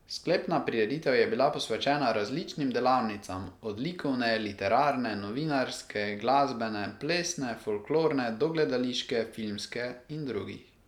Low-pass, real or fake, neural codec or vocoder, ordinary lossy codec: 19.8 kHz; real; none; none